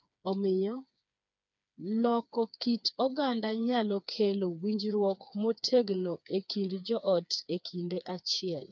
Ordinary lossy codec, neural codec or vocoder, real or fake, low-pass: none; codec, 16 kHz, 4 kbps, FreqCodec, smaller model; fake; 7.2 kHz